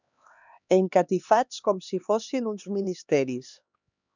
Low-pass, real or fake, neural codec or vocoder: 7.2 kHz; fake; codec, 16 kHz, 2 kbps, X-Codec, HuBERT features, trained on LibriSpeech